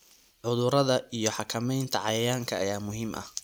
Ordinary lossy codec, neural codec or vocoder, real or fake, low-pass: none; none; real; none